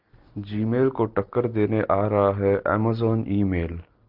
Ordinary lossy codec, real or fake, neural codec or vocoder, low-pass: Opus, 32 kbps; real; none; 5.4 kHz